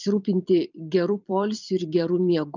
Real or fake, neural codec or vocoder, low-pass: fake; vocoder, 44.1 kHz, 128 mel bands every 256 samples, BigVGAN v2; 7.2 kHz